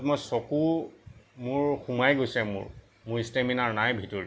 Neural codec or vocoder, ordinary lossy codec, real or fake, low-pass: none; none; real; none